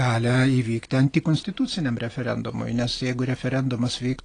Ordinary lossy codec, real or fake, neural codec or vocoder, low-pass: AAC, 32 kbps; real; none; 9.9 kHz